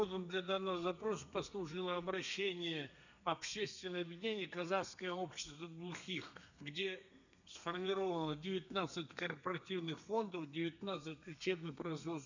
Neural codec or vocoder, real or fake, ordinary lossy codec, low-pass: codec, 32 kHz, 1.9 kbps, SNAC; fake; none; 7.2 kHz